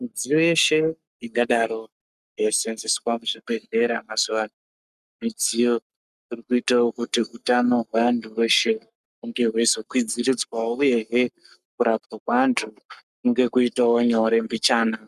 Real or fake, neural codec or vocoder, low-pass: fake; codec, 44.1 kHz, 7.8 kbps, Pupu-Codec; 14.4 kHz